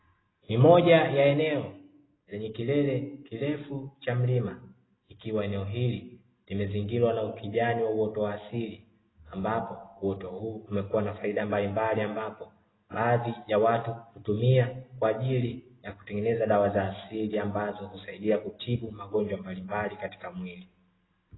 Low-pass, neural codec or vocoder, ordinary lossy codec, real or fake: 7.2 kHz; none; AAC, 16 kbps; real